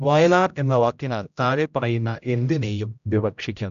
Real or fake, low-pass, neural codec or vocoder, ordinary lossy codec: fake; 7.2 kHz; codec, 16 kHz, 0.5 kbps, X-Codec, HuBERT features, trained on general audio; none